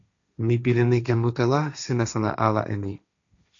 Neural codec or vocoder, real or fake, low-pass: codec, 16 kHz, 1.1 kbps, Voila-Tokenizer; fake; 7.2 kHz